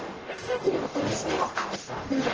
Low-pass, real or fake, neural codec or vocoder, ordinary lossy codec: 7.2 kHz; fake; codec, 44.1 kHz, 0.9 kbps, DAC; Opus, 16 kbps